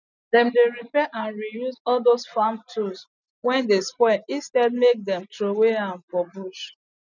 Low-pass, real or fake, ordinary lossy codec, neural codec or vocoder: 7.2 kHz; real; none; none